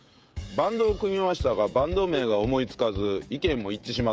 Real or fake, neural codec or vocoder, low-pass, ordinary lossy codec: fake; codec, 16 kHz, 16 kbps, FreqCodec, smaller model; none; none